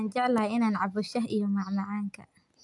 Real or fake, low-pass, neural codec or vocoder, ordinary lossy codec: real; 10.8 kHz; none; AAC, 64 kbps